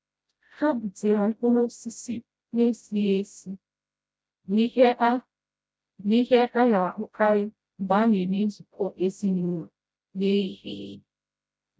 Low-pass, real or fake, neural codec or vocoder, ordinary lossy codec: none; fake; codec, 16 kHz, 0.5 kbps, FreqCodec, smaller model; none